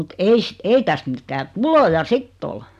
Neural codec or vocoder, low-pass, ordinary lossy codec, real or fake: none; 14.4 kHz; none; real